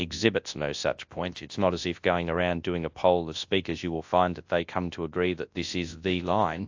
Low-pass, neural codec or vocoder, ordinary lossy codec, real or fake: 7.2 kHz; codec, 24 kHz, 0.9 kbps, WavTokenizer, large speech release; AAC, 48 kbps; fake